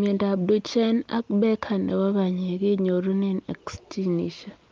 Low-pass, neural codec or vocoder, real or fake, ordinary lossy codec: 7.2 kHz; none; real; Opus, 24 kbps